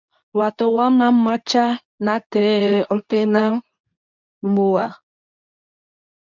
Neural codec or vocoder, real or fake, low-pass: codec, 24 kHz, 0.9 kbps, WavTokenizer, medium speech release version 2; fake; 7.2 kHz